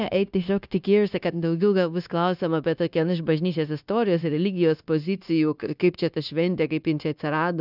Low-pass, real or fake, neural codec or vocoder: 5.4 kHz; fake; codec, 16 kHz, 0.9 kbps, LongCat-Audio-Codec